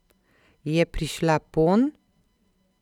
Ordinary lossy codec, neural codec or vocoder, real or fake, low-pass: none; none; real; 19.8 kHz